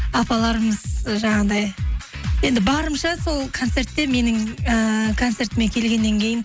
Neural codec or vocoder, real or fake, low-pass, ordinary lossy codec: none; real; none; none